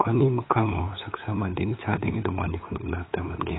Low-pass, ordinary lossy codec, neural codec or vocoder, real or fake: 7.2 kHz; AAC, 16 kbps; codec, 16 kHz, 8 kbps, FunCodec, trained on LibriTTS, 25 frames a second; fake